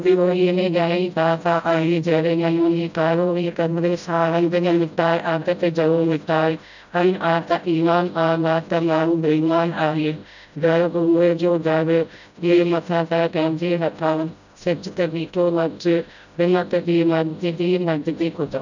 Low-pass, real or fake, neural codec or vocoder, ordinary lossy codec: 7.2 kHz; fake; codec, 16 kHz, 0.5 kbps, FreqCodec, smaller model; none